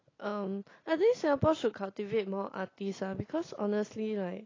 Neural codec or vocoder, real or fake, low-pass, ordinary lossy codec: none; real; 7.2 kHz; AAC, 32 kbps